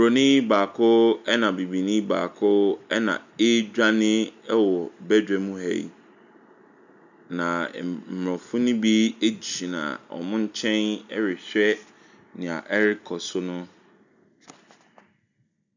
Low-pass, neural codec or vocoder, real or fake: 7.2 kHz; none; real